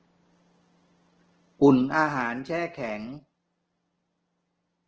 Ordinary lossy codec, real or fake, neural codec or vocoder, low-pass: Opus, 16 kbps; real; none; 7.2 kHz